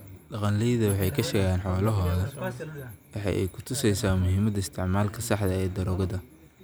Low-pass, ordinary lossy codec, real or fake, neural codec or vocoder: none; none; real; none